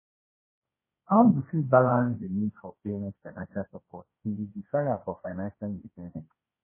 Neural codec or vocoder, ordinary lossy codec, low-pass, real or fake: codec, 16 kHz, 1.1 kbps, Voila-Tokenizer; MP3, 16 kbps; 3.6 kHz; fake